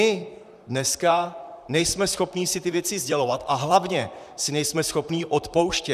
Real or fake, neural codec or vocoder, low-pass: fake; vocoder, 44.1 kHz, 128 mel bands, Pupu-Vocoder; 14.4 kHz